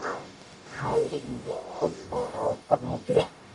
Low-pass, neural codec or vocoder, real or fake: 10.8 kHz; codec, 44.1 kHz, 0.9 kbps, DAC; fake